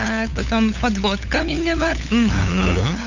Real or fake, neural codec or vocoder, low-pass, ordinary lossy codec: fake; codec, 16 kHz, 8 kbps, FunCodec, trained on LibriTTS, 25 frames a second; 7.2 kHz; none